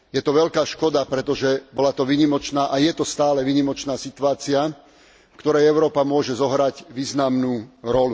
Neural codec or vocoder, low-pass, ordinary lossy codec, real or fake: none; none; none; real